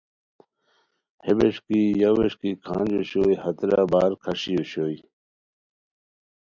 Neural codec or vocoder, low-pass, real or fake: none; 7.2 kHz; real